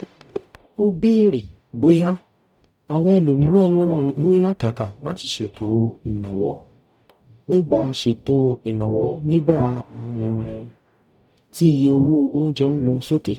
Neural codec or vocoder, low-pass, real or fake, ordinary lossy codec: codec, 44.1 kHz, 0.9 kbps, DAC; 19.8 kHz; fake; none